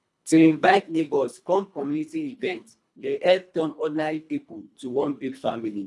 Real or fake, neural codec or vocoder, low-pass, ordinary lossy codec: fake; codec, 24 kHz, 1.5 kbps, HILCodec; none; none